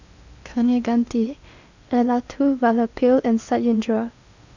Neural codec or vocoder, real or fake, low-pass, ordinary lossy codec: codec, 16 kHz in and 24 kHz out, 0.8 kbps, FocalCodec, streaming, 65536 codes; fake; 7.2 kHz; none